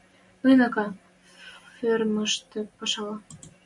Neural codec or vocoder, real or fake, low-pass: none; real; 10.8 kHz